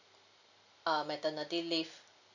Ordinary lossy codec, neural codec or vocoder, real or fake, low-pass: none; none; real; 7.2 kHz